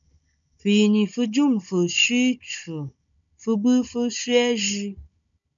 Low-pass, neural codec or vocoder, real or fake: 7.2 kHz; codec, 16 kHz, 16 kbps, FunCodec, trained on Chinese and English, 50 frames a second; fake